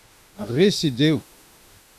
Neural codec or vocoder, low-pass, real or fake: autoencoder, 48 kHz, 32 numbers a frame, DAC-VAE, trained on Japanese speech; 14.4 kHz; fake